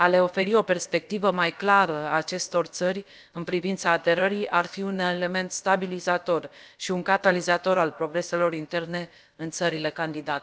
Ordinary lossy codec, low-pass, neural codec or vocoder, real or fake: none; none; codec, 16 kHz, about 1 kbps, DyCAST, with the encoder's durations; fake